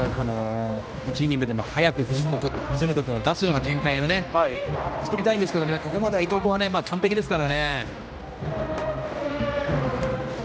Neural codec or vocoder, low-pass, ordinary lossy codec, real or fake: codec, 16 kHz, 1 kbps, X-Codec, HuBERT features, trained on general audio; none; none; fake